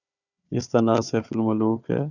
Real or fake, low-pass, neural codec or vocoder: fake; 7.2 kHz; codec, 16 kHz, 4 kbps, FunCodec, trained on Chinese and English, 50 frames a second